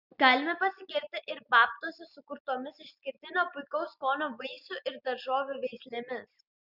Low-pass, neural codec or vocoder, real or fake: 5.4 kHz; none; real